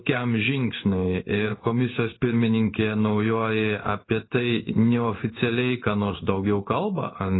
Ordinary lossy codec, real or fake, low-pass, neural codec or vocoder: AAC, 16 kbps; fake; 7.2 kHz; codec, 16 kHz in and 24 kHz out, 1 kbps, XY-Tokenizer